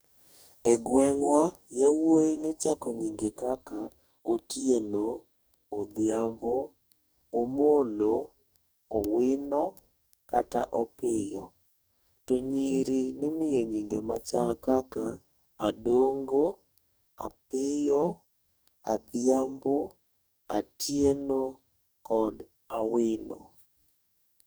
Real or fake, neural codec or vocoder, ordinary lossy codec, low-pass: fake; codec, 44.1 kHz, 2.6 kbps, DAC; none; none